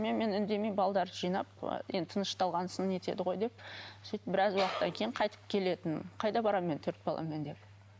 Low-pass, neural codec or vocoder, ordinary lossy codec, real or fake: none; none; none; real